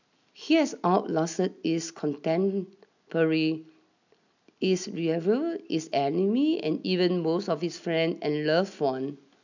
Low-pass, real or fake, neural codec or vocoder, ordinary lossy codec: 7.2 kHz; real; none; none